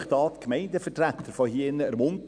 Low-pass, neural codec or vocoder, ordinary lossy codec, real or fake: 9.9 kHz; none; none; real